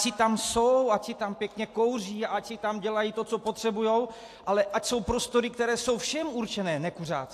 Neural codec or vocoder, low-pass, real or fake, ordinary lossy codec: none; 14.4 kHz; real; AAC, 64 kbps